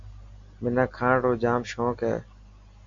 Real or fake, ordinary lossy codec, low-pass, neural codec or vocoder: real; AAC, 48 kbps; 7.2 kHz; none